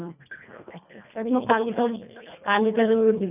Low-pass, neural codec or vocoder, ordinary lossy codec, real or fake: 3.6 kHz; codec, 24 kHz, 1.5 kbps, HILCodec; none; fake